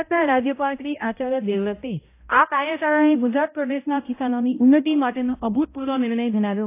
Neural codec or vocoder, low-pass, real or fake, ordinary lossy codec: codec, 16 kHz, 0.5 kbps, X-Codec, HuBERT features, trained on balanced general audio; 3.6 kHz; fake; AAC, 24 kbps